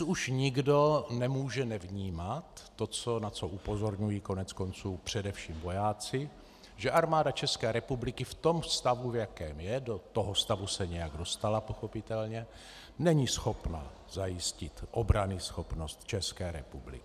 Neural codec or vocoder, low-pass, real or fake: none; 14.4 kHz; real